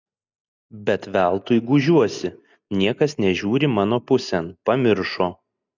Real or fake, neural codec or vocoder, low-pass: real; none; 7.2 kHz